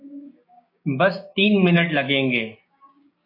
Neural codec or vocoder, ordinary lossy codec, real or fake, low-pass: codec, 16 kHz, 6 kbps, DAC; MP3, 32 kbps; fake; 5.4 kHz